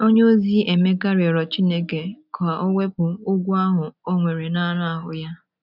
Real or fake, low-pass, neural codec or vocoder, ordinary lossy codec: real; 5.4 kHz; none; none